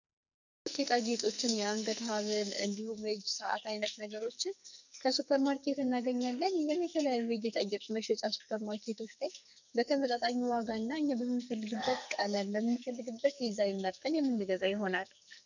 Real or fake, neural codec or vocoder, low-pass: fake; codec, 44.1 kHz, 2.6 kbps, SNAC; 7.2 kHz